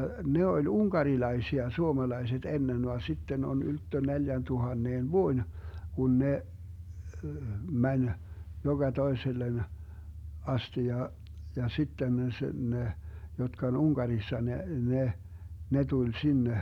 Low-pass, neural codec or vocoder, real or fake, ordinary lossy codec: 19.8 kHz; none; real; none